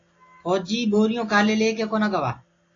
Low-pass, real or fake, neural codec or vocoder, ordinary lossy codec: 7.2 kHz; real; none; AAC, 32 kbps